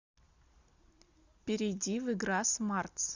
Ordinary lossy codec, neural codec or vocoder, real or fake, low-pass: Opus, 64 kbps; none; real; 7.2 kHz